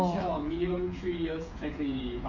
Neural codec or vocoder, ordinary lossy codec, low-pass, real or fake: codec, 16 kHz, 8 kbps, FreqCodec, smaller model; MP3, 48 kbps; 7.2 kHz; fake